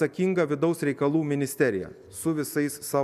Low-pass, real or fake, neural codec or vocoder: 14.4 kHz; real; none